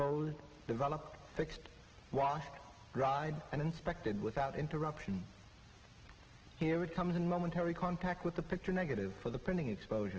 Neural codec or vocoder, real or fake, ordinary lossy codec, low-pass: none; real; Opus, 16 kbps; 7.2 kHz